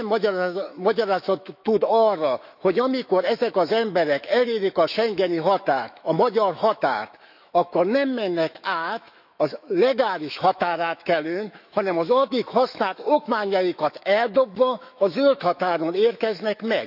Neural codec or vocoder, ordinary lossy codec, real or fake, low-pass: autoencoder, 48 kHz, 128 numbers a frame, DAC-VAE, trained on Japanese speech; none; fake; 5.4 kHz